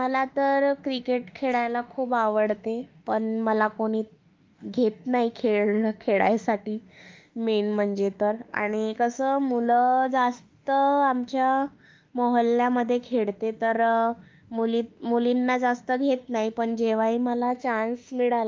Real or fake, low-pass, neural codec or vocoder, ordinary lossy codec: fake; 7.2 kHz; autoencoder, 48 kHz, 32 numbers a frame, DAC-VAE, trained on Japanese speech; Opus, 24 kbps